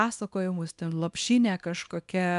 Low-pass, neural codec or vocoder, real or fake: 10.8 kHz; codec, 24 kHz, 0.9 kbps, WavTokenizer, small release; fake